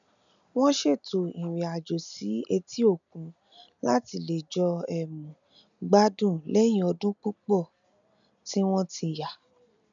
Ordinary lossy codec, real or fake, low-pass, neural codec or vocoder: none; real; 7.2 kHz; none